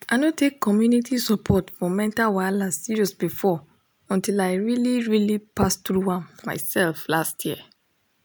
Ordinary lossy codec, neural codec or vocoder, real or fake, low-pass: none; none; real; none